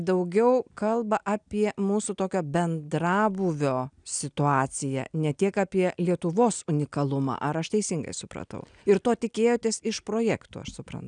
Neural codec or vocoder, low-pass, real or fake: none; 9.9 kHz; real